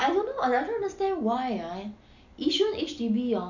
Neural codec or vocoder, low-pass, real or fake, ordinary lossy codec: none; 7.2 kHz; real; none